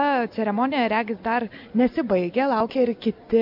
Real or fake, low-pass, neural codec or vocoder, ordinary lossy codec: real; 5.4 kHz; none; MP3, 48 kbps